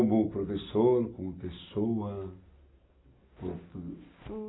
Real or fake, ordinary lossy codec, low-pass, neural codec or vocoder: real; AAC, 16 kbps; 7.2 kHz; none